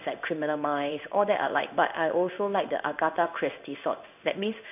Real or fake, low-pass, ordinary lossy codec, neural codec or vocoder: fake; 3.6 kHz; none; codec, 16 kHz in and 24 kHz out, 1 kbps, XY-Tokenizer